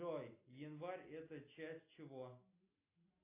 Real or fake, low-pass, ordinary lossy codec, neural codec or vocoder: real; 3.6 kHz; AAC, 32 kbps; none